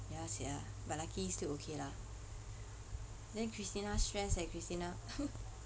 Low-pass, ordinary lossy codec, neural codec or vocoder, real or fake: none; none; none; real